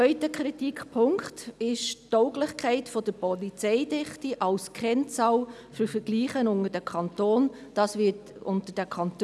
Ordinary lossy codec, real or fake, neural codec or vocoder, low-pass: none; real; none; none